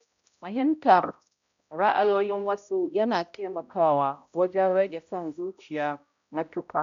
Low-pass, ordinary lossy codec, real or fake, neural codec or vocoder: 7.2 kHz; none; fake; codec, 16 kHz, 0.5 kbps, X-Codec, HuBERT features, trained on balanced general audio